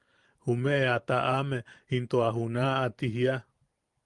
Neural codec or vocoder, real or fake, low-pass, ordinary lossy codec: vocoder, 44.1 kHz, 128 mel bands every 512 samples, BigVGAN v2; fake; 10.8 kHz; Opus, 32 kbps